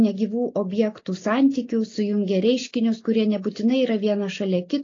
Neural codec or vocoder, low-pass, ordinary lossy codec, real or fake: none; 7.2 kHz; AAC, 32 kbps; real